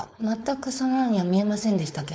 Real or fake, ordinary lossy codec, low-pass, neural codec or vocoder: fake; none; none; codec, 16 kHz, 4.8 kbps, FACodec